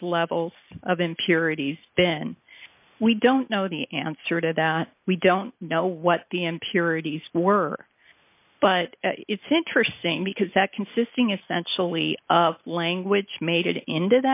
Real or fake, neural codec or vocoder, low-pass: real; none; 3.6 kHz